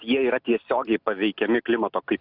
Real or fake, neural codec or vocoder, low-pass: real; none; 5.4 kHz